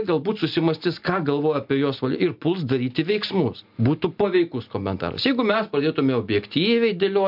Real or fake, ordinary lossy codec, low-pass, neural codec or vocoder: real; MP3, 48 kbps; 5.4 kHz; none